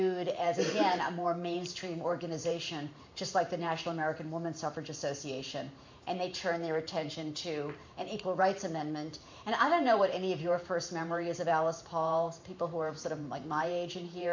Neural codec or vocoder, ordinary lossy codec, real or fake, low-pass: vocoder, 44.1 kHz, 128 mel bands every 512 samples, BigVGAN v2; MP3, 48 kbps; fake; 7.2 kHz